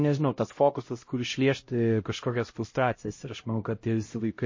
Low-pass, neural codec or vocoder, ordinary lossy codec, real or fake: 7.2 kHz; codec, 16 kHz, 0.5 kbps, X-Codec, HuBERT features, trained on LibriSpeech; MP3, 32 kbps; fake